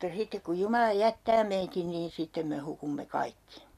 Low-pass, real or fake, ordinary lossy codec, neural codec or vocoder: 14.4 kHz; real; none; none